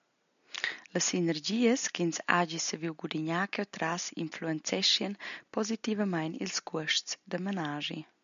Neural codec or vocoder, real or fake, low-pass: none; real; 7.2 kHz